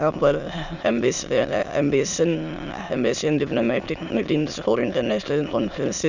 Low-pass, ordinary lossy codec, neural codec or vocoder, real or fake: 7.2 kHz; none; autoencoder, 22.05 kHz, a latent of 192 numbers a frame, VITS, trained on many speakers; fake